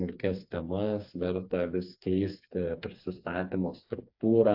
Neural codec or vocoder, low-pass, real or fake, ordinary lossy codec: codec, 16 kHz, 4 kbps, FreqCodec, smaller model; 5.4 kHz; fake; AAC, 48 kbps